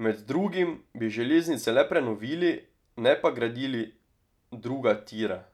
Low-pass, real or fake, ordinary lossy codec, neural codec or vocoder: 19.8 kHz; real; none; none